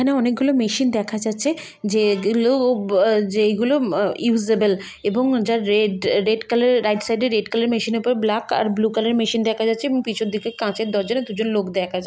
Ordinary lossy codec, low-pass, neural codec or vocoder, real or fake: none; none; none; real